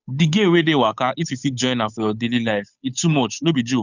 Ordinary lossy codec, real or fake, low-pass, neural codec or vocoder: none; fake; 7.2 kHz; codec, 16 kHz, 8 kbps, FunCodec, trained on Chinese and English, 25 frames a second